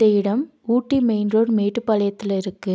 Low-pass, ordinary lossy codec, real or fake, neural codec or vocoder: none; none; real; none